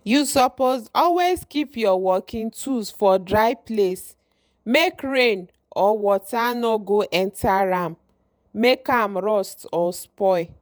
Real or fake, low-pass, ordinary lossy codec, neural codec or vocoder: fake; none; none; vocoder, 48 kHz, 128 mel bands, Vocos